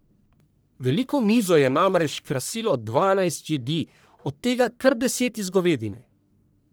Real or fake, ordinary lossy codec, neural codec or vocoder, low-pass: fake; none; codec, 44.1 kHz, 1.7 kbps, Pupu-Codec; none